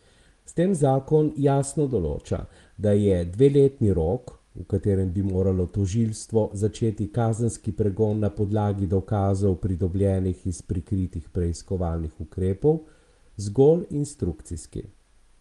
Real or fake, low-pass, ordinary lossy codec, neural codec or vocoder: real; 10.8 kHz; Opus, 24 kbps; none